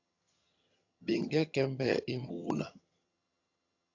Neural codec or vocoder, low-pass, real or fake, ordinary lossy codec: vocoder, 22.05 kHz, 80 mel bands, HiFi-GAN; 7.2 kHz; fake; AAC, 48 kbps